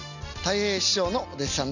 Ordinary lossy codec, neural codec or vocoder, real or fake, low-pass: none; none; real; 7.2 kHz